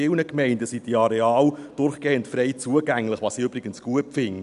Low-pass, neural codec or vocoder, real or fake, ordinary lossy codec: 10.8 kHz; none; real; none